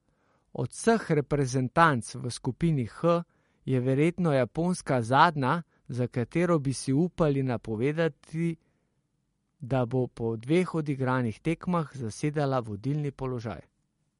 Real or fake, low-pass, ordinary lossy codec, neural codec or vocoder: real; 19.8 kHz; MP3, 48 kbps; none